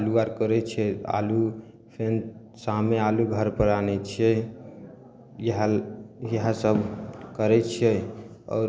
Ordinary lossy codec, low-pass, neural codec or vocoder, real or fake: none; none; none; real